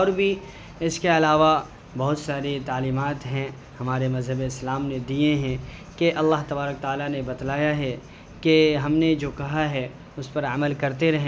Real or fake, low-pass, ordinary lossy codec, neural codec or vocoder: real; none; none; none